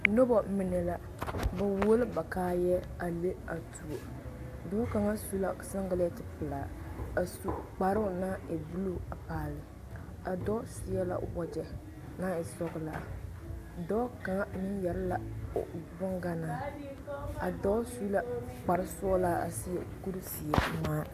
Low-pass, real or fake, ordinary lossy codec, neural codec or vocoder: 14.4 kHz; real; AAC, 64 kbps; none